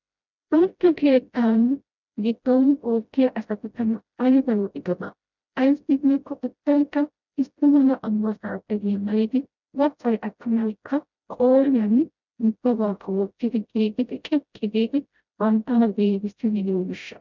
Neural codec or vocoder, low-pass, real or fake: codec, 16 kHz, 0.5 kbps, FreqCodec, smaller model; 7.2 kHz; fake